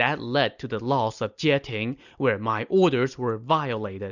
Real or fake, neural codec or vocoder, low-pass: real; none; 7.2 kHz